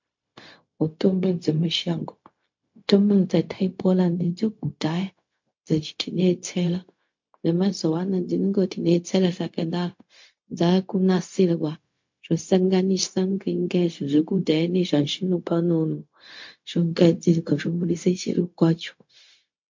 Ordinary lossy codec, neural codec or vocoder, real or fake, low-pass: MP3, 48 kbps; codec, 16 kHz, 0.4 kbps, LongCat-Audio-Codec; fake; 7.2 kHz